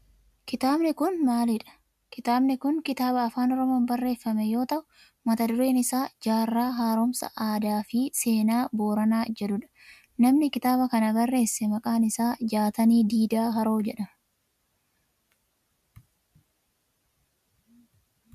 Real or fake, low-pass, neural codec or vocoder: real; 14.4 kHz; none